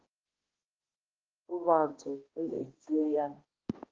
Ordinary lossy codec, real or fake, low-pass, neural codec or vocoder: Opus, 16 kbps; fake; 7.2 kHz; codec, 16 kHz, 1 kbps, X-Codec, HuBERT features, trained on balanced general audio